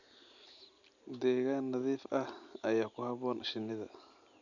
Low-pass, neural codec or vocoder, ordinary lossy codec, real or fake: 7.2 kHz; none; none; real